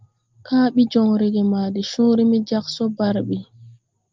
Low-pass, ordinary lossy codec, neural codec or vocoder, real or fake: 7.2 kHz; Opus, 32 kbps; none; real